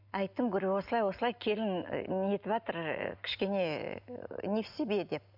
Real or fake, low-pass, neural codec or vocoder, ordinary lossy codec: real; 5.4 kHz; none; none